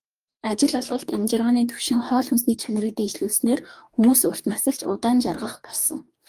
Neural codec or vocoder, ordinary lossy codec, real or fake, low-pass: codec, 44.1 kHz, 2.6 kbps, DAC; Opus, 32 kbps; fake; 14.4 kHz